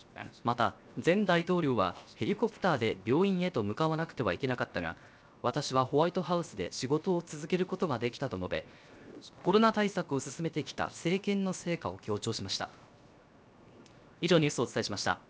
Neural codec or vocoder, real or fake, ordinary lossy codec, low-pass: codec, 16 kHz, 0.7 kbps, FocalCodec; fake; none; none